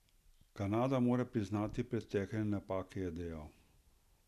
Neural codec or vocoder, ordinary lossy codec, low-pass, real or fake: none; none; 14.4 kHz; real